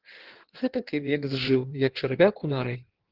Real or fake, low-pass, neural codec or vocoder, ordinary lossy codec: fake; 5.4 kHz; codec, 16 kHz in and 24 kHz out, 1.1 kbps, FireRedTTS-2 codec; Opus, 16 kbps